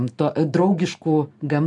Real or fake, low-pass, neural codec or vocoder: real; 10.8 kHz; none